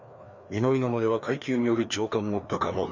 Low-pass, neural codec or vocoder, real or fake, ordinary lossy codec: 7.2 kHz; codec, 16 kHz, 2 kbps, FreqCodec, larger model; fake; none